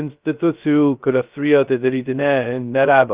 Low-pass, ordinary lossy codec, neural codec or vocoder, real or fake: 3.6 kHz; Opus, 24 kbps; codec, 16 kHz, 0.2 kbps, FocalCodec; fake